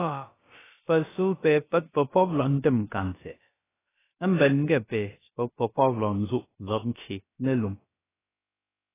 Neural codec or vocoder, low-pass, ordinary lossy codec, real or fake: codec, 16 kHz, about 1 kbps, DyCAST, with the encoder's durations; 3.6 kHz; AAC, 16 kbps; fake